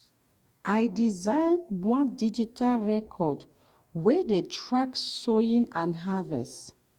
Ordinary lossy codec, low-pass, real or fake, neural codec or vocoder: Opus, 64 kbps; 19.8 kHz; fake; codec, 44.1 kHz, 2.6 kbps, DAC